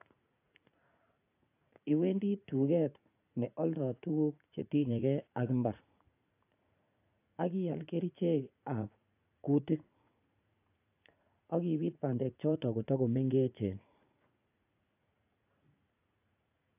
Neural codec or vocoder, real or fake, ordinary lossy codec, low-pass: vocoder, 44.1 kHz, 80 mel bands, Vocos; fake; AAC, 24 kbps; 3.6 kHz